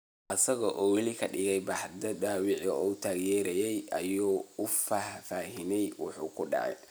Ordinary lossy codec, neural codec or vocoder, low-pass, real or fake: none; none; none; real